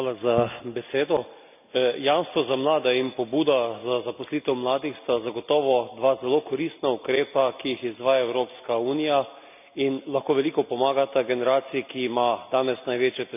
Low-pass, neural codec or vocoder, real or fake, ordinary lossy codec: 3.6 kHz; none; real; none